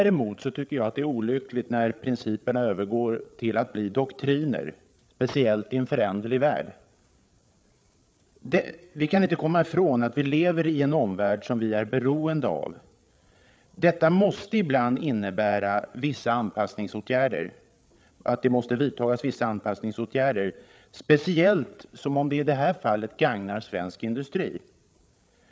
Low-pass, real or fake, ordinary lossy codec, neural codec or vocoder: none; fake; none; codec, 16 kHz, 8 kbps, FreqCodec, larger model